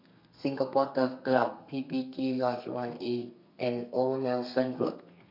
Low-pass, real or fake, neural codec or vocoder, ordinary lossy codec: 5.4 kHz; fake; codec, 32 kHz, 1.9 kbps, SNAC; MP3, 48 kbps